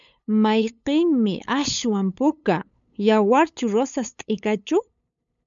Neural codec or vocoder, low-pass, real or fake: codec, 16 kHz, 8 kbps, FunCodec, trained on LibriTTS, 25 frames a second; 7.2 kHz; fake